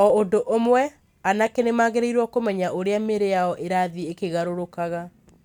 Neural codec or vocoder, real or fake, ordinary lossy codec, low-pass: none; real; none; 19.8 kHz